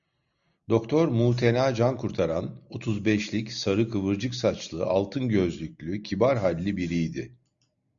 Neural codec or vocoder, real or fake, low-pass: none; real; 7.2 kHz